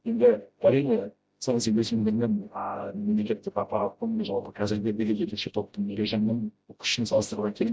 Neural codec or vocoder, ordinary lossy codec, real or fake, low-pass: codec, 16 kHz, 0.5 kbps, FreqCodec, smaller model; none; fake; none